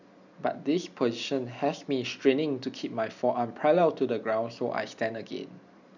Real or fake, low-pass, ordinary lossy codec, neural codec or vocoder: real; 7.2 kHz; none; none